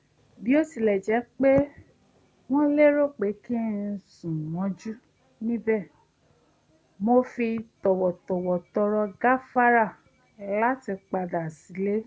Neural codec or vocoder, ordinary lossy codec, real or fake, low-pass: none; none; real; none